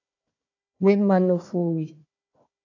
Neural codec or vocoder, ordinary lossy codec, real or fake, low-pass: codec, 16 kHz, 1 kbps, FunCodec, trained on Chinese and English, 50 frames a second; AAC, 48 kbps; fake; 7.2 kHz